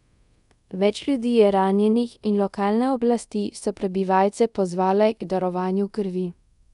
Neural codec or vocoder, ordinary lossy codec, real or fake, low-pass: codec, 24 kHz, 0.5 kbps, DualCodec; none; fake; 10.8 kHz